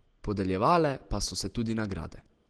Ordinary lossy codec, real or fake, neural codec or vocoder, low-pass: Opus, 16 kbps; real; none; 9.9 kHz